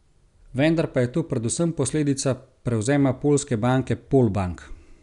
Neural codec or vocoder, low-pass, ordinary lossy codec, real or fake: none; 10.8 kHz; none; real